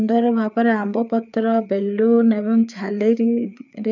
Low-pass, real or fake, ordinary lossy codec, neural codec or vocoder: 7.2 kHz; fake; none; codec, 16 kHz, 4 kbps, FreqCodec, larger model